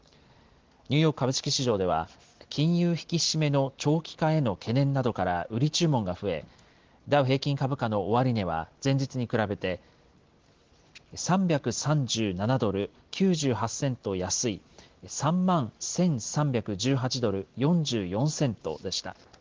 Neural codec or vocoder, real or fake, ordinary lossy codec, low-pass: none; real; Opus, 16 kbps; 7.2 kHz